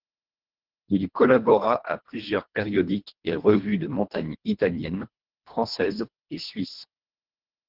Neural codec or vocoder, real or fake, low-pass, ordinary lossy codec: codec, 24 kHz, 1.5 kbps, HILCodec; fake; 5.4 kHz; Opus, 16 kbps